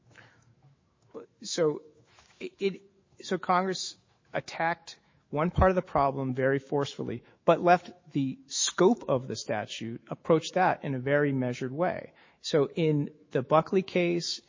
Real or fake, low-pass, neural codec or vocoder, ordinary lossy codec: fake; 7.2 kHz; autoencoder, 48 kHz, 128 numbers a frame, DAC-VAE, trained on Japanese speech; MP3, 32 kbps